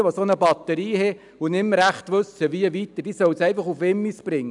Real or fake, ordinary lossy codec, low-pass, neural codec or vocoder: real; none; 10.8 kHz; none